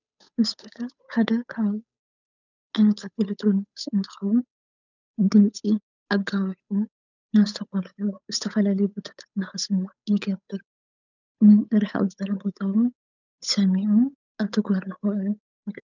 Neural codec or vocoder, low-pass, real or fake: codec, 16 kHz, 8 kbps, FunCodec, trained on Chinese and English, 25 frames a second; 7.2 kHz; fake